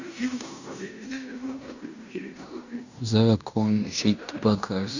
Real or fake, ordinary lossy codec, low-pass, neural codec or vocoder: fake; none; 7.2 kHz; codec, 16 kHz in and 24 kHz out, 0.9 kbps, LongCat-Audio-Codec, fine tuned four codebook decoder